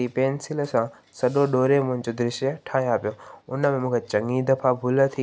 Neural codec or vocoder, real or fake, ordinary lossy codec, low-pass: none; real; none; none